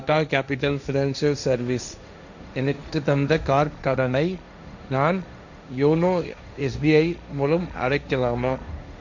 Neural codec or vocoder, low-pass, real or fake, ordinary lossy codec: codec, 16 kHz, 1.1 kbps, Voila-Tokenizer; 7.2 kHz; fake; none